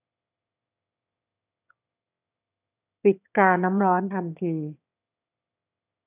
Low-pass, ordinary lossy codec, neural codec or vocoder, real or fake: 3.6 kHz; none; autoencoder, 22.05 kHz, a latent of 192 numbers a frame, VITS, trained on one speaker; fake